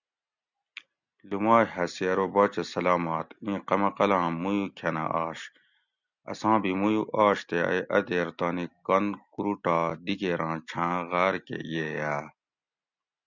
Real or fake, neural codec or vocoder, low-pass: real; none; 7.2 kHz